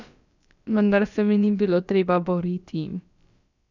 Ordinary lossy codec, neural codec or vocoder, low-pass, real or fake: none; codec, 16 kHz, about 1 kbps, DyCAST, with the encoder's durations; 7.2 kHz; fake